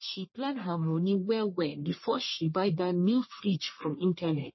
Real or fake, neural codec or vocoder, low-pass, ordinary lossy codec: fake; codec, 44.1 kHz, 1.7 kbps, Pupu-Codec; 7.2 kHz; MP3, 24 kbps